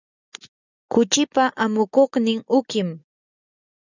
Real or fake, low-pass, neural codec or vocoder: real; 7.2 kHz; none